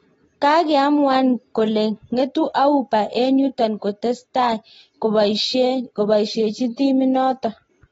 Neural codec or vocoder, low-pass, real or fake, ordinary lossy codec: none; 19.8 kHz; real; AAC, 24 kbps